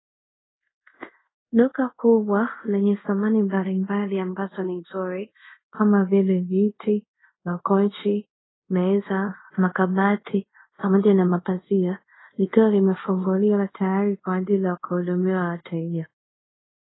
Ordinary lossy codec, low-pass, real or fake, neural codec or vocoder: AAC, 16 kbps; 7.2 kHz; fake; codec, 24 kHz, 0.5 kbps, DualCodec